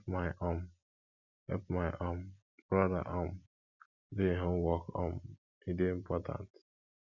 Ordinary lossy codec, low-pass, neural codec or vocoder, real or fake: none; 7.2 kHz; none; real